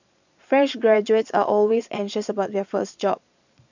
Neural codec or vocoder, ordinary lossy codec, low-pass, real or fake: vocoder, 22.05 kHz, 80 mel bands, Vocos; none; 7.2 kHz; fake